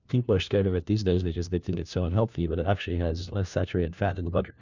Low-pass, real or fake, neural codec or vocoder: 7.2 kHz; fake; codec, 16 kHz, 1 kbps, FunCodec, trained on LibriTTS, 50 frames a second